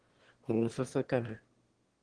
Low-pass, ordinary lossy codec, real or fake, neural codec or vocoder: 9.9 kHz; Opus, 16 kbps; fake; autoencoder, 22.05 kHz, a latent of 192 numbers a frame, VITS, trained on one speaker